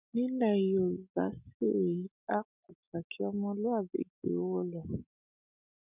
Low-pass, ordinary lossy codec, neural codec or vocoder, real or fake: 3.6 kHz; none; none; real